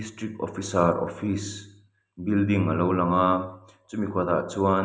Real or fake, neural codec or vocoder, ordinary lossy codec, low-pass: real; none; none; none